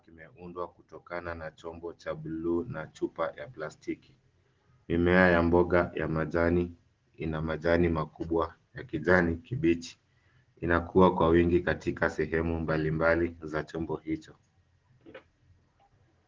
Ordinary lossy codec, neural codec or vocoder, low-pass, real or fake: Opus, 16 kbps; none; 7.2 kHz; real